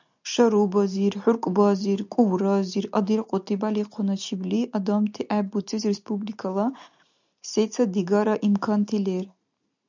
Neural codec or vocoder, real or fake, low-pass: none; real; 7.2 kHz